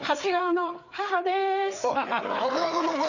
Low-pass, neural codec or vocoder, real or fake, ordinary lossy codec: 7.2 kHz; codec, 16 kHz, 4 kbps, FreqCodec, larger model; fake; none